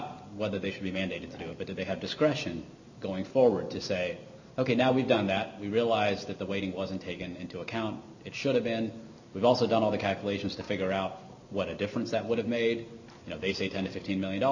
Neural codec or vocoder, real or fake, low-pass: none; real; 7.2 kHz